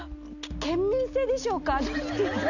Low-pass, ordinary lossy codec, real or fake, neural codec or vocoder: 7.2 kHz; none; real; none